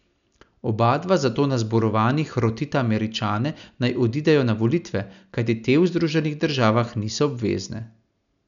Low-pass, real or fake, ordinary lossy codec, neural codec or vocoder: 7.2 kHz; real; none; none